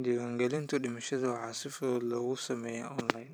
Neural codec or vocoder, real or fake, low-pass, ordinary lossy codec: vocoder, 44.1 kHz, 128 mel bands every 512 samples, BigVGAN v2; fake; none; none